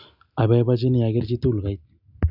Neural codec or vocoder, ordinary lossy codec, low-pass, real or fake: none; none; 5.4 kHz; real